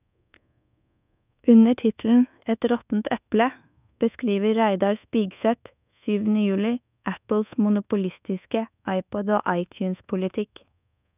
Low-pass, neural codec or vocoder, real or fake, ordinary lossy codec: 3.6 kHz; codec, 24 kHz, 1.2 kbps, DualCodec; fake; none